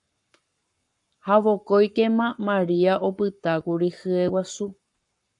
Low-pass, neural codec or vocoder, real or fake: 10.8 kHz; codec, 44.1 kHz, 7.8 kbps, Pupu-Codec; fake